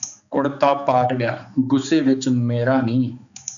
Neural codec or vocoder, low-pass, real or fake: codec, 16 kHz, 4 kbps, X-Codec, HuBERT features, trained on balanced general audio; 7.2 kHz; fake